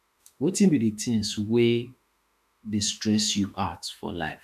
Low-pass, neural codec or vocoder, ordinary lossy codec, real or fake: 14.4 kHz; autoencoder, 48 kHz, 32 numbers a frame, DAC-VAE, trained on Japanese speech; none; fake